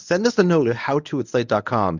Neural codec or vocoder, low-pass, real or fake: codec, 24 kHz, 0.9 kbps, WavTokenizer, medium speech release version 1; 7.2 kHz; fake